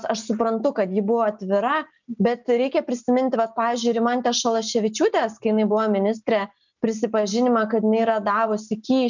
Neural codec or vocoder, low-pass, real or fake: none; 7.2 kHz; real